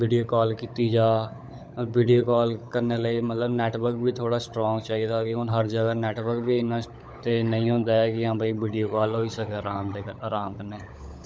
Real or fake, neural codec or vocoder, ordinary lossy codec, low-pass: fake; codec, 16 kHz, 16 kbps, FunCodec, trained on LibriTTS, 50 frames a second; none; none